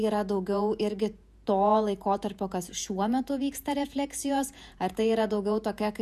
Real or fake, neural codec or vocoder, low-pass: fake; vocoder, 48 kHz, 128 mel bands, Vocos; 14.4 kHz